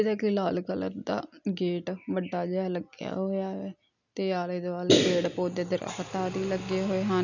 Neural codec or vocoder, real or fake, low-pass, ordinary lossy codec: none; real; 7.2 kHz; none